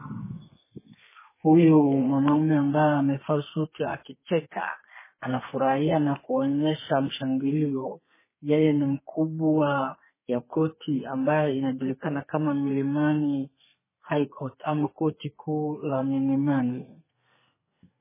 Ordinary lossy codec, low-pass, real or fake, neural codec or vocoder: MP3, 16 kbps; 3.6 kHz; fake; codec, 32 kHz, 1.9 kbps, SNAC